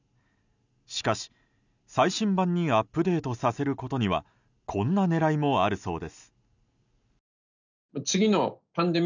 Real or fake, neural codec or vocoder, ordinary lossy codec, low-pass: real; none; none; 7.2 kHz